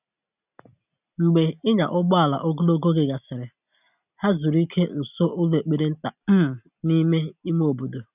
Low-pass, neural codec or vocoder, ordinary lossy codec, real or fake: 3.6 kHz; none; none; real